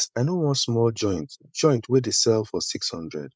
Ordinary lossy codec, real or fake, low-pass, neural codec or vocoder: none; fake; none; codec, 16 kHz, 16 kbps, FreqCodec, larger model